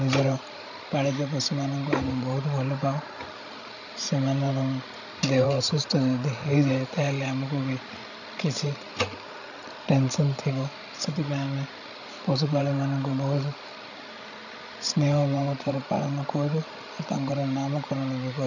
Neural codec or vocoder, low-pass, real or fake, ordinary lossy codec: codec, 16 kHz, 16 kbps, FreqCodec, larger model; 7.2 kHz; fake; none